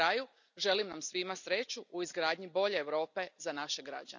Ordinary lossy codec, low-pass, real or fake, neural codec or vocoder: none; 7.2 kHz; real; none